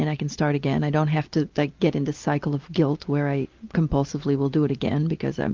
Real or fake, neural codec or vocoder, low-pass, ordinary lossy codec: real; none; 7.2 kHz; Opus, 32 kbps